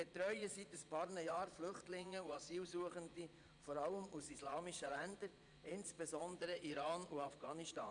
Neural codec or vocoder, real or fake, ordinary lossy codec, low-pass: vocoder, 22.05 kHz, 80 mel bands, Vocos; fake; none; 9.9 kHz